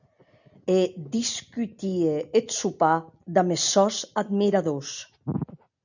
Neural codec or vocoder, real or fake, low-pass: none; real; 7.2 kHz